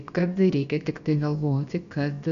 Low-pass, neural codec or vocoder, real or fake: 7.2 kHz; codec, 16 kHz, about 1 kbps, DyCAST, with the encoder's durations; fake